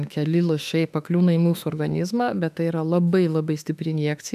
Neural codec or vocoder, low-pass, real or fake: autoencoder, 48 kHz, 32 numbers a frame, DAC-VAE, trained on Japanese speech; 14.4 kHz; fake